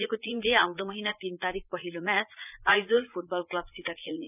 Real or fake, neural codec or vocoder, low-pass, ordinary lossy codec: fake; vocoder, 44.1 kHz, 80 mel bands, Vocos; 3.6 kHz; none